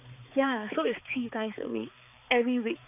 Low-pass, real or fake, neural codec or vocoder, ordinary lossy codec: 3.6 kHz; fake; codec, 16 kHz, 4 kbps, X-Codec, HuBERT features, trained on balanced general audio; none